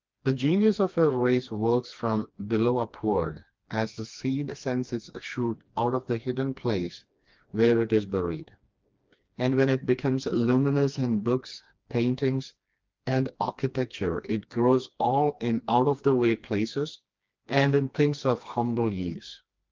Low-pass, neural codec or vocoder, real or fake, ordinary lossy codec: 7.2 kHz; codec, 16 kHz, 2 kbps, FreqCodec, smaller model; fake; Opus, 24 kbps